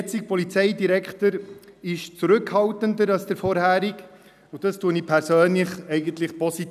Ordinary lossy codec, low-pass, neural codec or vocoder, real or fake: none; 14.4 kHz; none; real